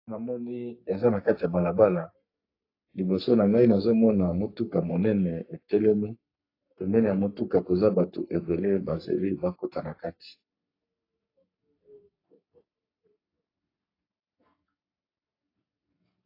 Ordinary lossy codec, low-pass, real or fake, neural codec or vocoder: AAC, 32 kbps; 5.4 kHz; fake; codec, 44.1 kHz, 3.4 kbps, Pupu-Codec